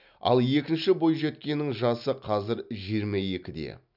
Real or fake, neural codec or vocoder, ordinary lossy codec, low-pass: real; none; none; 5.4 kHz